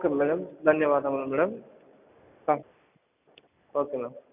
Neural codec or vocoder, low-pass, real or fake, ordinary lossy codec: none; 3.6 kHz; real; none